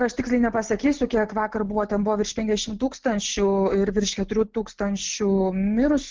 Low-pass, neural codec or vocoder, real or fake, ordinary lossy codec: 7.2 kHz; none; real; Opus, 16 kbps